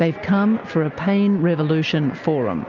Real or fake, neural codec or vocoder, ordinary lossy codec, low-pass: real; none; Opus, 24 kbps; 7.2 kHz